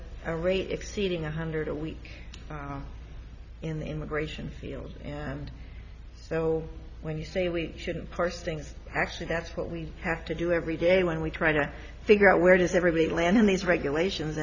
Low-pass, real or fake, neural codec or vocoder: 7.2 kHz; real; none